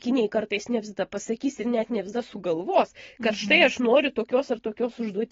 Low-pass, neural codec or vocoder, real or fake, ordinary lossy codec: 7.2 kHz; none; real; AAC, 24 kbps